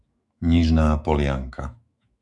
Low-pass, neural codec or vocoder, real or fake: 10.8 kHz; autoencoder, 48 kHz, 128 numbers a frame, DAC-VAE, trained on Japanese speech; fake